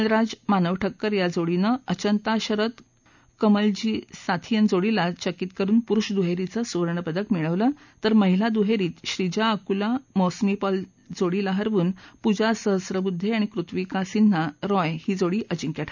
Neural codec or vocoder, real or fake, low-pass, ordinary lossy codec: none; real; 7.2 kHz; none